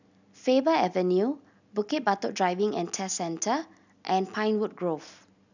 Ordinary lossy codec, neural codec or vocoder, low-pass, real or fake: none; none; 7.2 kHz; real